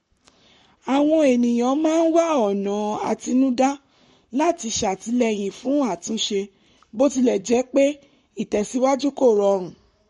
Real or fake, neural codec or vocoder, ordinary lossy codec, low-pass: fake; codec, 44.1 kHz, 7.8 kbps, Pupu-Codec; MP3, 48 kbps; 19.8 kHz